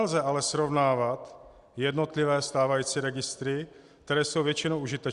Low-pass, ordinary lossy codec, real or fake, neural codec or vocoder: 10.8 kHz; Opus, 64 kbps; real; none